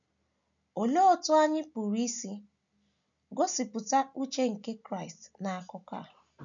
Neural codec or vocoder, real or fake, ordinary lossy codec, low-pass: none; real; none; 7.2 kHz